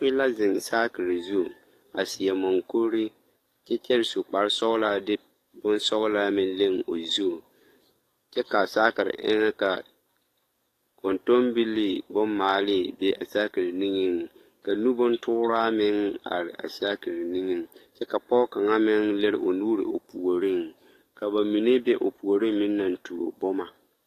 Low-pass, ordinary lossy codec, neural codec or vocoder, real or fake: 14.4 kHz; AAC, 48 kbps; codec, 44.1 kHz, 7.8 kbps, DAC; fake